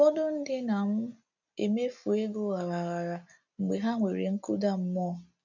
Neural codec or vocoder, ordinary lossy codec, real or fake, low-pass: none; none; real; 7.2 kHz